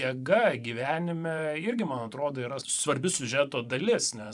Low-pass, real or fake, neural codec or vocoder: 10.8 kHz; fake; vocoder, 24 kHz, 100 mel bands, Vocos